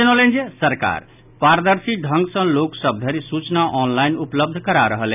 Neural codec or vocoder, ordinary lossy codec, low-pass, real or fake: none; none; 3.6 kHz; real